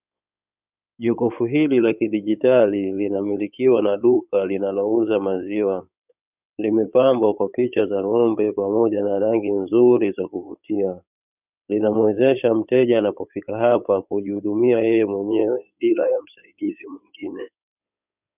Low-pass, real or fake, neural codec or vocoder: 3.6 kHz; fake; codec, 16 kHz in and 24 kHz out, 2.2 kbps, FireRedTTS-2 codec